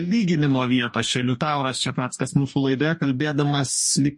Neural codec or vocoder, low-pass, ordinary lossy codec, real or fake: codec, 44.1 kHz, 2.6 kbps, DAC; 10.8 kHz; MP3, 48 kbps; fake